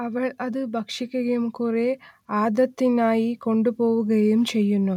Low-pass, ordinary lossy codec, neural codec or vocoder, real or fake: 19.8 kHz; none; none; real